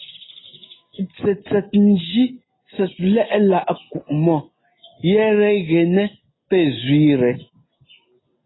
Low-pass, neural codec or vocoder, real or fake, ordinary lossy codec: 7.2 kHz; none; real; AAC, 16 kbps